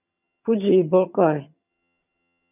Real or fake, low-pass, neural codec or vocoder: fake; 3.6 kHz; vocoder, 22.05 kHz, 80 mel bands, HiFi-GAN